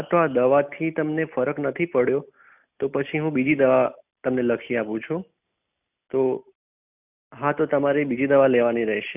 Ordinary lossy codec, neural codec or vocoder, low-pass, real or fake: none; none; 3.6 kHz; real